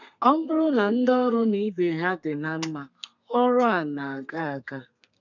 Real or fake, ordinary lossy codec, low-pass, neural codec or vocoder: fake; none; 7.2 kHz; codec, 32 kHz, 1.9 kbps, SNAC